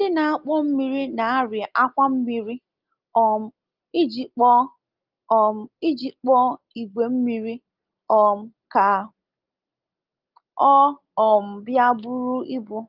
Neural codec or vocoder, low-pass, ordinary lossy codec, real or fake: none; 5.4 kHz; Opus, 32 kbps; real